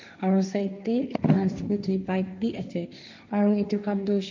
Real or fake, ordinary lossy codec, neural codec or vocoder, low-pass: fake; MP3, 64 kbps; codec, 16 kHz, 1.1 kbps, Voila-Tokenizer; 7.2 kHz